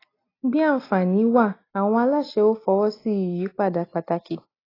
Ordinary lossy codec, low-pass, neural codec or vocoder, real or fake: AAC, 24 kbps; 5.4 kHz; none; real